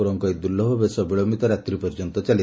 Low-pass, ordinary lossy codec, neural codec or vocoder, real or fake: none; none; none; real